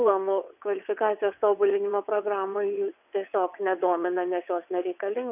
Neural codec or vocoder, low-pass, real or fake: vocoder, 22.05 kHz, 80 mel bands, WaveNeXt; 3.6 kHz; fake